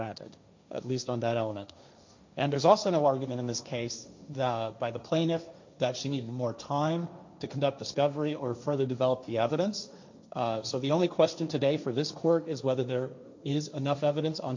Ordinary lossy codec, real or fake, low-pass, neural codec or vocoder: MP3, 64 kbps; fake; 7.2 kHz; codec, 16 kHz, 1.1 kbps, Voila-Tokenizer